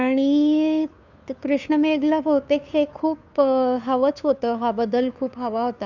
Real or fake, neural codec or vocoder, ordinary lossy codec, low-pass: fake; codec, 16 kHz, 2 kbps, FunCodec, trained on Chinese and English, 25 frames a second; none; 7.2 kHz